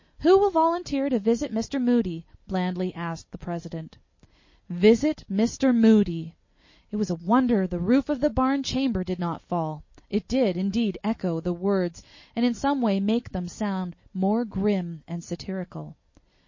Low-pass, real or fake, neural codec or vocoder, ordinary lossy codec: 7.2 kHz; real; none; MP3, 32 kbps